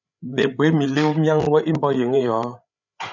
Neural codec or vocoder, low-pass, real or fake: codec, 16 kHz, 8 kbps, FreqCodec, larger model; 7.2 kHz; fake